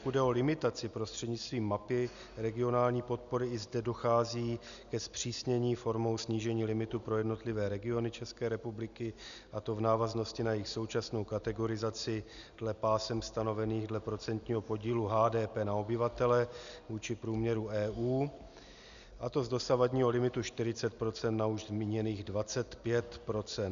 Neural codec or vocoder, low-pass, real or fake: none; 7.2 kHz; real